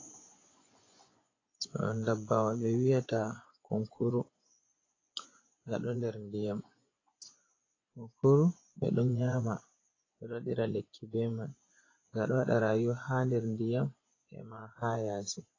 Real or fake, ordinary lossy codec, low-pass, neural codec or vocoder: fake; AAC, 32 kbps; 7.2 kHz; vocoder, 24 kHz, 100 mel bands, Vocos